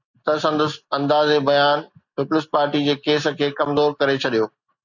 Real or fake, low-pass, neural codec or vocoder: real; 7.2 kHz; none